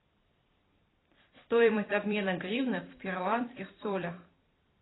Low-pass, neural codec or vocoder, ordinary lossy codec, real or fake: 7.2 kHz; vocoder, 44.1 kHz, 128 mel bands every 256 samples, BigVGAN v2; AAC, 16 kbps; fake